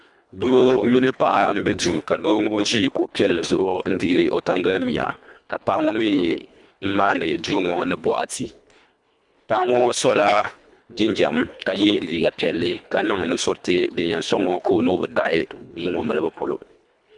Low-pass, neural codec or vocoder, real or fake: 10.8 kHz; codec, 24 kHz, 1.5 kbps, HILCodec; fake